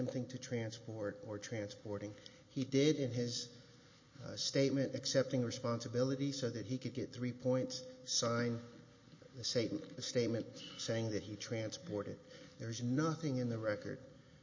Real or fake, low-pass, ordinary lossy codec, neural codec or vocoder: real; 7.2 kHz; MP3, 32 kbps; none